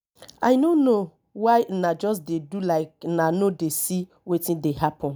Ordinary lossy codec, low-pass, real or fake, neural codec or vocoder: none; none; real; none